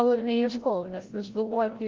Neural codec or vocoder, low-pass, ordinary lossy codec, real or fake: codec, 16 kHz, 0.5 kbps, FreqCodec, larger model; 7.2 kHz; Opus, 16 kbps; fake